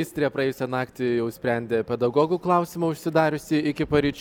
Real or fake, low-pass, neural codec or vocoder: real; 19.8 kHz; none